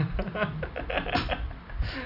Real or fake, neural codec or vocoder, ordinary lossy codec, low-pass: real; none; none; 5.4 kHz